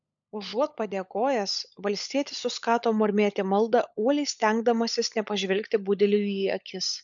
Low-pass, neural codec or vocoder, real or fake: 7.2 kHz; codec, 16 kHz, 16 kbps, FunCodec, trained on LibriTTS, 50 frames a second; fake